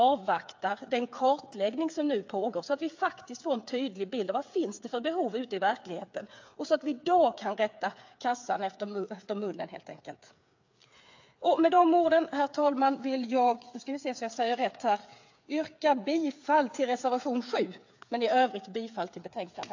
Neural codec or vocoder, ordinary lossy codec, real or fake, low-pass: codec, 16 kHz, 8 kbps, FreqCodec, smaller model; AAC, 48 kbps; fake; 7.2 kHz